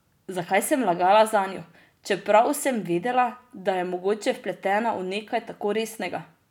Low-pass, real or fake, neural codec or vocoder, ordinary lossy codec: 19.8 kHz; real; none; none